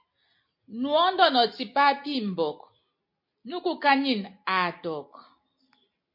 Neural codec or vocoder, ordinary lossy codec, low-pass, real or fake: none; MP3, 32 kbps; 5.4 kHz; real